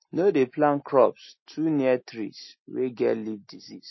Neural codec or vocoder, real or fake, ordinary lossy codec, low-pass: none; real; MP3, 24 kbps; 7.2 kHz